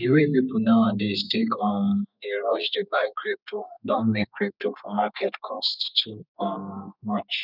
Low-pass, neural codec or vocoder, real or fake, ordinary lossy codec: 5.4 kHz; codec, 32 kHz, 1.9 kbps, SNAC; fake; none